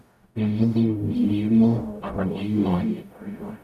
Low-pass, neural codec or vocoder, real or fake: 14.4 kHz; codec, 44.1 kHz, 0.9 kbps, DAC; fake